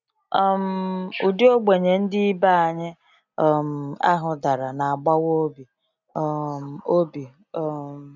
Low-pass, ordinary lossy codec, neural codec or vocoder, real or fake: 7.2 kHz; none; none; real